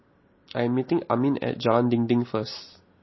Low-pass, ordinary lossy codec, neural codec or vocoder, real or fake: 7.2 kHz; MP3, 24 kbps; none; real